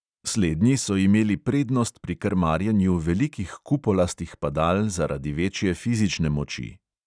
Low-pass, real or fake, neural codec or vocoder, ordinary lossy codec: 9.9 kHz; real; none; none